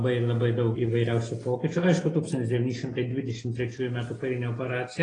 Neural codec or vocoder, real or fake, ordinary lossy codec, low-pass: none; real; AAC, 32 kbps; 9.9 kHz